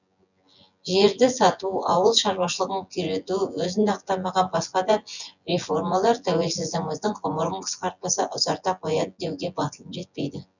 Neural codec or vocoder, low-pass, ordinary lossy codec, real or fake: vocoder, 24 kHz, 100 mel bands, Vocos; 7.2 kHz; none; fake